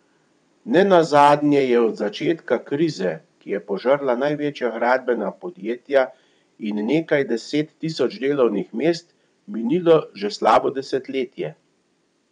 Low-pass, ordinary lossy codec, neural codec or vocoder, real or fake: 9.9 kHz; none; vocoder, 22.05 kHz, 80 mel bands, WaveNeXt; fake